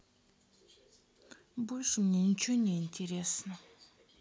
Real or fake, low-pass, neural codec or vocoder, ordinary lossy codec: real; none; none; none